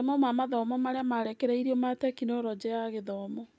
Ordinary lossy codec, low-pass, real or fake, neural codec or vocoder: none; none; real; none